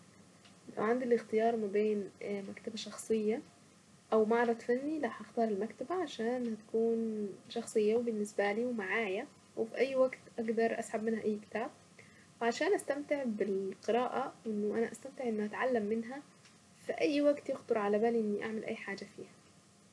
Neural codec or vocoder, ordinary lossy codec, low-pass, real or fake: none; none; none; real